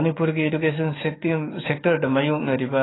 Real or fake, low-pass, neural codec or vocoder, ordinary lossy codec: fake; 7.2 kHz; codec, 44.1 kHz, 7.8 kbps, DAC; AAC, 16 kbps